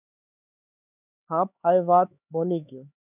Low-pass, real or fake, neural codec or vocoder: 3.6 kHz; fake; codec, 16 kHz, 4 kbps, X-Codec, HuBERT features, trained on LibriSpeech